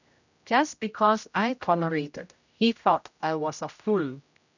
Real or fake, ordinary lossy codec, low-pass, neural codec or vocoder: fake; none; 7.2 kHz; codec, 16 kHz, 0.5 kbps, X-Codec, HuBERT features, trained on general audio